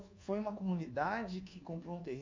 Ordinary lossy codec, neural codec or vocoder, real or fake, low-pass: MP3, 48 kbps; codec, 24 kHz, 1.2 kbps, DualCodec; fake; 7.2 kHz